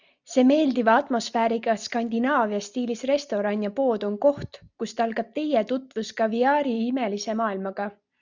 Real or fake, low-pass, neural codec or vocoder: real; 7.2 kHz; none